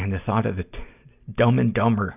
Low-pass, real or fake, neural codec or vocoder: 3.6 kHz; real; none